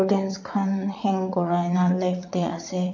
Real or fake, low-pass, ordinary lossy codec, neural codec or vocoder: fake; 7.2 kHz; none; vocoder, 22.05 kHz, 80 mel bands, WaveNeXt